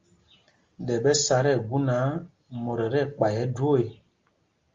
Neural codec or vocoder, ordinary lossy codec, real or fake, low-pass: none; Opus, 32 kbps; real; 7.2 kHz